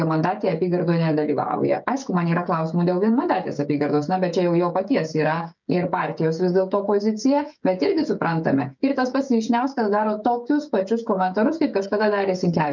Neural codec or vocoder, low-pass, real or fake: codec, 16 kHz, 16 kbps, FreqCodec, smaller model; 7.2 kHz; fake